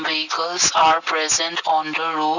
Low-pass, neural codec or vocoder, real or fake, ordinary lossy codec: 7.2 kHz; none; real; none